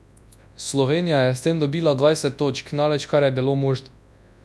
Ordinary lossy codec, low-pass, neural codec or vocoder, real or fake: none; none; codec, 24 kHz, 0.9 kbps, WavTokenizer, large speech release; fake